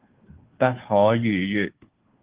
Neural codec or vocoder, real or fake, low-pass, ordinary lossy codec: codec, 16 kHz, 2 kbps, FunCodec, trained on Chinese and English, 25 frames a second; fake; 3.6 kHz; Opus, 16 kbps